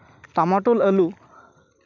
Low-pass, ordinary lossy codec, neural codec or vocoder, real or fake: 7.2 kHz; none; none; real